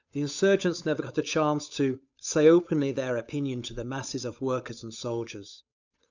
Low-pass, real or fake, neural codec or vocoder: 7.2 kHz; fake; codec, 16 kHz, 8 kbps, FunCodec, trained on Chinese and English, 25 frames a second